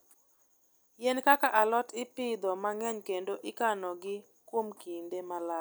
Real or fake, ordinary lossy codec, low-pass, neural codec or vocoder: real; none; none; none